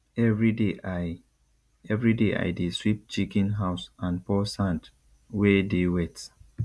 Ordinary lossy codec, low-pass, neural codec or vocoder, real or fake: none; none; none; real